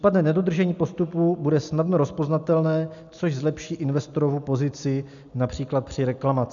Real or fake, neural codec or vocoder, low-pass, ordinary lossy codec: real; none; 7.2 kHz; MP3, 96 kbps